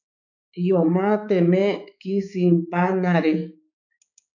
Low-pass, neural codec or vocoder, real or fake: 7.2 kHz; codec, 16 kHz, 4 kbps, X-Codec, HuBERT features, trained on balanced general audio; fake